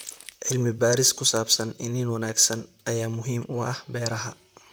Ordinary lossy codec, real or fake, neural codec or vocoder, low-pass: none; fake; vocoder, 44.1 kHz, 128 mel bands, Pupu-Vocoder; none